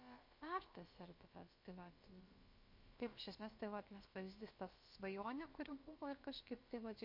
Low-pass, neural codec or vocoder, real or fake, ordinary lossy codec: 5.4 kHz; codec, 16 kHz, about 1 kbps, DyCAST, with the encoder's durations; fake; MP3, 32 kbps